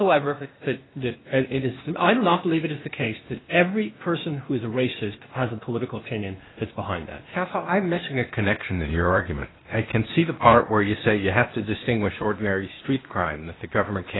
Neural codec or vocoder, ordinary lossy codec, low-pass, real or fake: codec, 16 kHz, 0.8 kbps, ZipCodec; AAC, 16 kbps; 7.2 kHz; fake